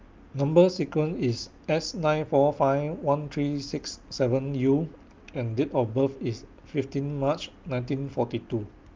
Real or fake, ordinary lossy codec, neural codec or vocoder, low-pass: real; Opus, 24 kbps; none; 7.2 kHz